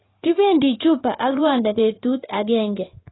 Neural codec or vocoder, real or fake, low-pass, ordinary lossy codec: codec, 16 kHz in and 24 kHz out, 2.2 kbps, FireRedTTS-2 codec; fake; 7.2 kHz; AAC, 16 kbps